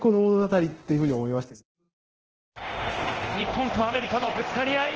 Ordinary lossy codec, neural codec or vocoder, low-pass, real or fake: Opus, 16 kbps; codec, 24 kHz, 0.9 kbps, DualCodec; 7.2 kHz; fake